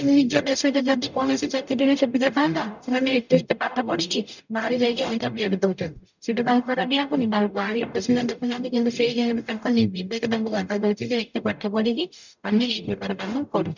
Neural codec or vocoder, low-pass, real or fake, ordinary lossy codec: codec, 44.1 kHz, 0.9 kbps, DAC; 7.2 kHz; fake; none